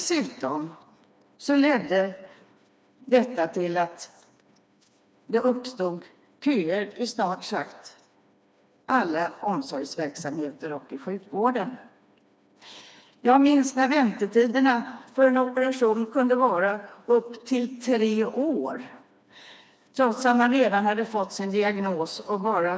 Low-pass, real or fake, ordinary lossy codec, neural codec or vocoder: none; fake; none; codec, 16 kHz, 2 kbps, FreqCodec, smaller model